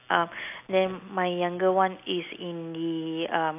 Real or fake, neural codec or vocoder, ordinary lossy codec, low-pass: real; none; AAC, 32 kbps; 3.6 kHz